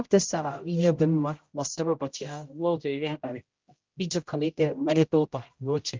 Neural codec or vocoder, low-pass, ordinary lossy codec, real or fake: codec, 16 kHz, 0.5 kbps, X-Codec, HuBERT features, trained on general audio; 7.2 kHz; Opus, 32 kbps; fake